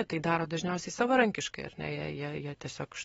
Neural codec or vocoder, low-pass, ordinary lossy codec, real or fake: none; 19.8 kHz; AAC, 24 kbps; real